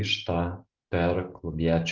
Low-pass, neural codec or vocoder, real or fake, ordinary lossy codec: 7.2 kHz; none; real; Opus, 24 kbps